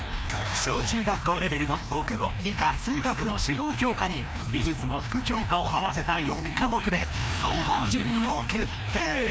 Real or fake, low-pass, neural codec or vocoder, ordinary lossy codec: fake; none; codec, 16 kHz, 1 kbps, FreqCodec, larger model; none